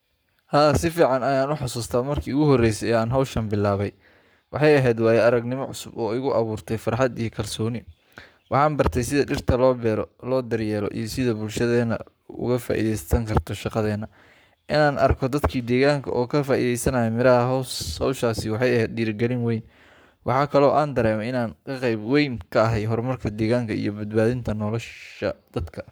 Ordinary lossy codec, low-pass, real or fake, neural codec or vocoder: none; none; fake; codec, 44.1 kHz, 7.8 kbps, Pupu-Codec